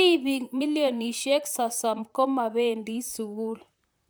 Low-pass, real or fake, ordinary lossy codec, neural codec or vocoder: none; fake; none; vocoder, 44.1 kHz, 128 mel bands, Pupu-Vocoder